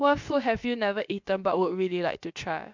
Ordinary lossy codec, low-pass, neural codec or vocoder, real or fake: MP3, 64 kbps; 7.2 kHz; codec, 16 kHz, about 1 kbps, DyCAST, with the encoder's durations; fake